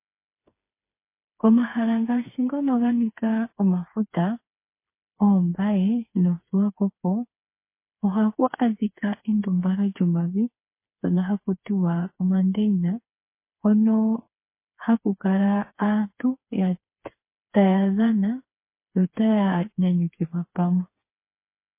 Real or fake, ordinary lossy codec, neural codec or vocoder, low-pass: fake; MP3, 24 kbps; codec, 16 kHz, 4 kbps, FreqCodec, smaller model; 3.6 kHz